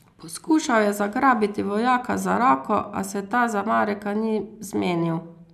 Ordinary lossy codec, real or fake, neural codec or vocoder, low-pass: none; real; none; 14.4 kHz